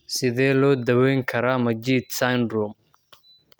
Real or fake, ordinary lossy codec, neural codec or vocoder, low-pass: real; none; none; none